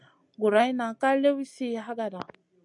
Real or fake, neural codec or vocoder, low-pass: real; none; 10.8 kHz